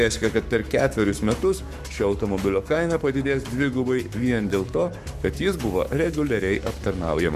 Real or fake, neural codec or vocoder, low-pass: fake; codec, 44.1 kHz, 7.8 kbps, Pupu-Codec; 14.4 kHz